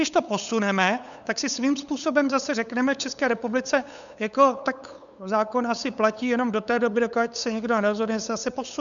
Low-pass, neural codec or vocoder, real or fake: 7.2 kHz; codec, 16 kHz, 8 kbps, FunCodec, trained on LibriTTS, 25 frames a second; fake